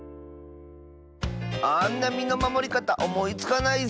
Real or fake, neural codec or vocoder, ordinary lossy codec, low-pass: real; none; none; none